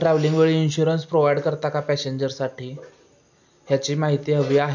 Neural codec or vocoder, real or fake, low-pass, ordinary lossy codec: none; real; 7.2 kHz; none